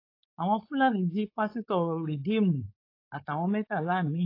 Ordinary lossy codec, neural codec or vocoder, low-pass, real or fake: AAC, 32 kbps; codec, 16 kHz, 4.8 kbps, FACodec; 5.4 kHz; fake